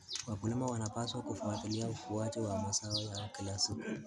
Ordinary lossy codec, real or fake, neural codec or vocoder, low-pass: none; real; none; none